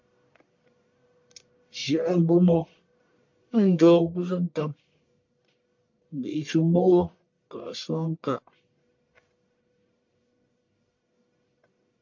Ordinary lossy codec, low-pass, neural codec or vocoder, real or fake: MP3, 48 kbps; 7.2 kHz; codec, 44.1 kHz, 1.7 kbps, Pupu-Codec; fake